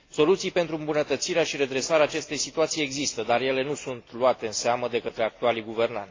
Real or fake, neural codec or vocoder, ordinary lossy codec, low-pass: real; none; AAC, 32 kbps; 7.2 kHz